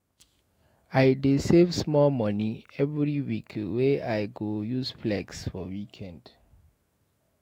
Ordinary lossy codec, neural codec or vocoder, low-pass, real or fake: AAC, 48 kbps; autoencoder, 48 kHz, 128 numbers a frame, DAC-VAE, trained on Japanese speech; 19.8 kHz; fake